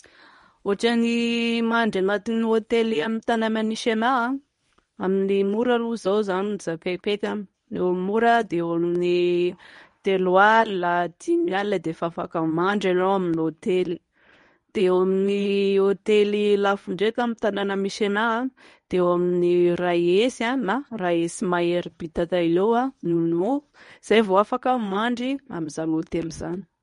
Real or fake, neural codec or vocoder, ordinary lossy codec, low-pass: fake; codec, 24 kHz, 0.9 kbps, WavTokenizer, medium speech release version 1; MP3, 48 kbps; 10.8 kHz